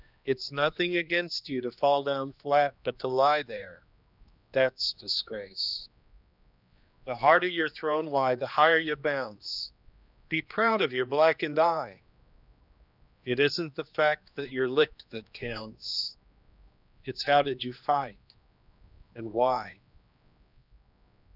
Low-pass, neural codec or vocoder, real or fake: 5.4 kHz; codec, 16 kHz, 2 kbps, X-Codec, HuBERT features, trained on general audio; fake